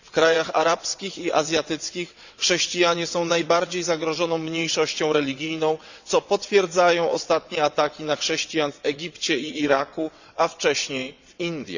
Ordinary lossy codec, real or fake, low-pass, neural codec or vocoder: none; fake; 7.2 kHz; vocoder, 22.05 kHz, 80 mel bands, WaveNeXt